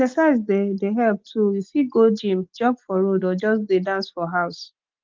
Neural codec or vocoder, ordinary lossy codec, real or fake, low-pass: none; Opus, 32 kbps; real; 7.2 kHz